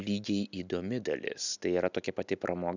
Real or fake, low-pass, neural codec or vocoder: real; 7.2 kHz; none